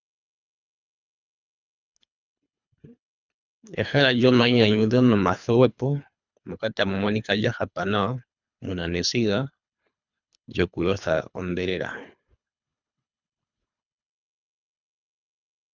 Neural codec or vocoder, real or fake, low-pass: codec, 24 kHz, 3 kbps, HILCodec; fake; 7.2 kHz